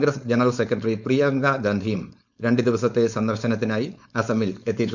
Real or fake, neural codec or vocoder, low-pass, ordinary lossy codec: fake; codec, 16 kHz, 4.8 kbps, FACodec; 7.2 kHz; none